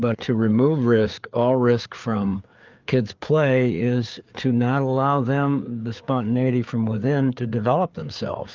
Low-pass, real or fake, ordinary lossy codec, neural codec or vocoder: 7.2 kHz; fake; Opus, 32 kbps; codec, 16 kHz, 4 kbps, FreqCodec, larger model